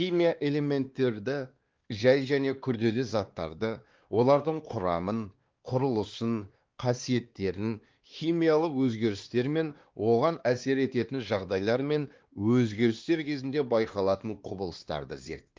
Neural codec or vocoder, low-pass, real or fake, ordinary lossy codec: codec, 16 kHz, 2 kbps, X-Codec, WavLM features, trained on Multilingual LibriSpeech; 7.2 kHz; fake; Opus, 32 kbps